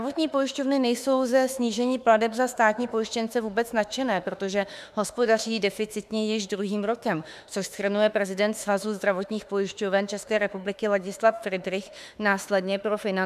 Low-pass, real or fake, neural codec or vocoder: 14.4 kHz; fake; autoencoder, 48 kHz, 32 numbers a frame, DAC-VAE, trained on Japanese speech